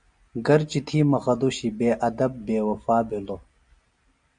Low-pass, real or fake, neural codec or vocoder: 9.9 kHz; real; none